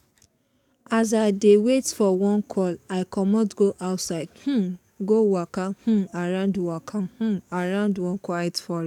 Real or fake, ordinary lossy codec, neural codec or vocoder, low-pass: fake; none; codec, 44.1 kHz, 7.8 kbps, DAC; 19.8 kHz